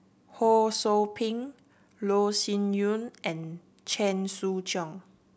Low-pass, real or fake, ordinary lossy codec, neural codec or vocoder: none; real; none; none